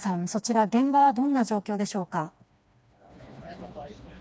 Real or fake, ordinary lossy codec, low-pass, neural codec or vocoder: fake; none; none; codec, 16 kHz, 2 kbps, FreqCodec, smaller model